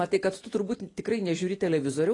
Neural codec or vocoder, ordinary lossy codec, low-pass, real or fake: none; AAC, 32 kbps; 10.8 kHz; real